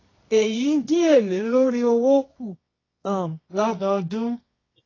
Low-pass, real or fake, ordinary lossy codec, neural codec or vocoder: 7.2 kHz; fake; AAC, 32 kbps; codec, 24 kHz, 0.9 kbps, WavTokenizer, medium music audio release